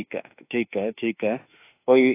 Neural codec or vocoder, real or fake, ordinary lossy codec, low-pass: autoencoder, 48 kHz, 32 numbers a frame, DAC-VAE, trained on Japanese speech; fake; AAC, 24 kbps; 3.6 kHz